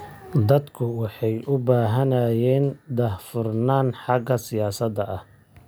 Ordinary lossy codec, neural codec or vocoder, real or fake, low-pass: none; none; real; none